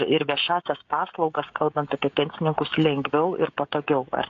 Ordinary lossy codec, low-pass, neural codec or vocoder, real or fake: AAC, 48 kbps; 7.2 kHz; codec, 16 kHz, 16 kbps, FreqCodec, smaller model; fake